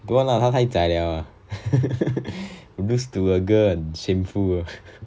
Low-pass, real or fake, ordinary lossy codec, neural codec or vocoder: none; real; none; none